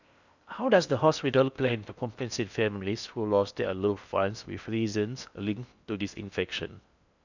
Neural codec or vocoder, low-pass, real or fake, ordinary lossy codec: codec, 16 kHz in and 24 kHz out, 0.6 kbps, FocalCodec, streaming, 4096 codes; 7.2 kHz; fake; none